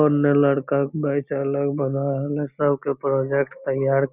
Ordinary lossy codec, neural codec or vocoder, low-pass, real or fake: none; none; 3.6 kHz; real